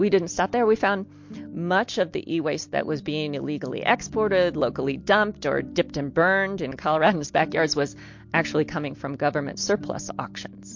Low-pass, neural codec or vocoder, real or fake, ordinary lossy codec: 7.2 kHz; none; real; MP3, 48 kbps